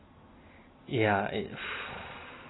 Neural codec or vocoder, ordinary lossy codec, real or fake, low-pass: none; AAC, 16 kbps; real; 7.2 kHz